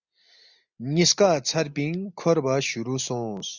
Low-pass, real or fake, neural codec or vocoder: 7.2 kHz; real; none